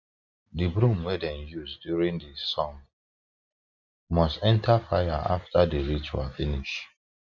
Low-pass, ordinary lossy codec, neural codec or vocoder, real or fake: 7.2 kHz; none; none; real